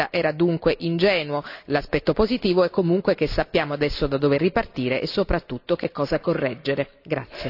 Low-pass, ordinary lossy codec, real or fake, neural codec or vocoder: 5.4 kHz; AAC, 48 kbps; real; none